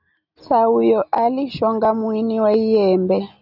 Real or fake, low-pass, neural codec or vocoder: real; 5.4 kHz; none